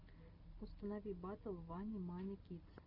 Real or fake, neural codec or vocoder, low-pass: real; none; 5.4 kHz